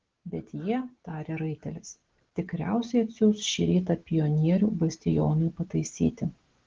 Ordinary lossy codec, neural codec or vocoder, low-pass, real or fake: Opus, 16 kbps; none; 7.2 kHz; real